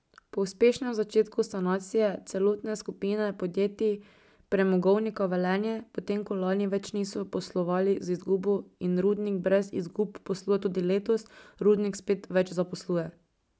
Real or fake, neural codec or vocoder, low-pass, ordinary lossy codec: real; none; none; none